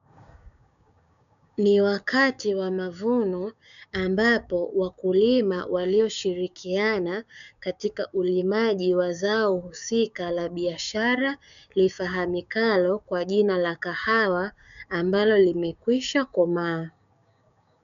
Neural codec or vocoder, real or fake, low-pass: codec, 16 kHz, 6 kbps, DAC; fake; 7.2 kHz